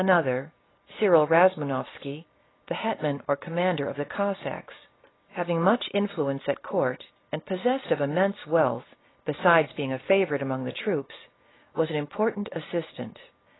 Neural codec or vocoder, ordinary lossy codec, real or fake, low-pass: none; AAC, 16 kbps; real; 7.2 kHz